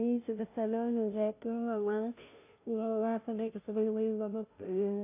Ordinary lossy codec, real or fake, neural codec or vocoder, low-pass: AAC, 24 kbps; fake; codec, 16 kHz, 0.5 kbps, FunCodec, trained on Chinese and English, 25 frames a second; 3.6 kHz